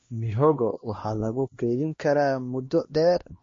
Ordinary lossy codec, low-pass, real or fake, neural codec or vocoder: MP3, 32 kbps; 7.2 kHz; fake; codec, 16 kHz, 1 kbps, X-Codec, HuBERT features, trained on LibriSpeech